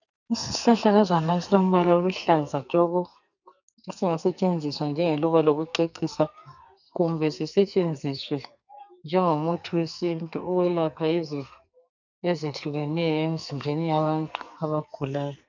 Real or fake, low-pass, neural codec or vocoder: fake; 7.2 kHz; codec, 44.1 kHz, 2.6 kbps, SNAC